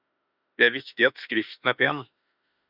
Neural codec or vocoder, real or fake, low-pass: autoencoder, 48 kHz, 32 numbers a frame, DAC-VAE, trained on Japanese speech; fake; 5.4 kHz